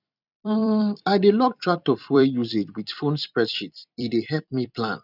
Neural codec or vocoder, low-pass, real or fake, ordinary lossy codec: vocoder, 44.1 kHz, 128 mel bands every 256 samples, BigVGAN v2; 5.4 kHz; fake; none